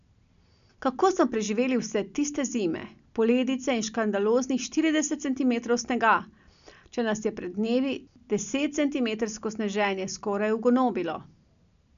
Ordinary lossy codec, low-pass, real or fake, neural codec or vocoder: MP3, 96 kbps; 7.2 kHz; real; none